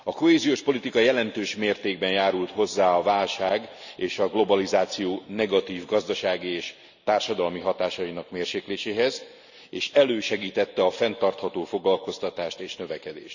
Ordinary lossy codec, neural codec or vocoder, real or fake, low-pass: none; none; real; 7.2 kHz